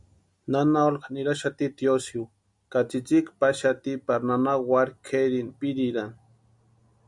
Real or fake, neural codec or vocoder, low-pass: real; none; 10.8 kHz